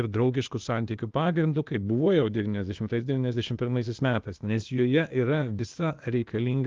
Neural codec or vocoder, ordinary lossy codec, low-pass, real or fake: codec, 16 kHz, 0.8 kbps, ZipCodec; Opus, 32 kbps; 7.2 kHz; fake